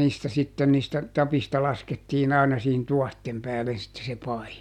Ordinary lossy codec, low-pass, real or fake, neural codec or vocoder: none; 19.8 kHz; real; none